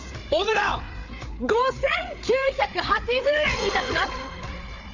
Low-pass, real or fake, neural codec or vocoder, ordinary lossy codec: 7.2 kHz; fake; codec, 16 kHz, 4 kbps, FreqCodec, larger model; none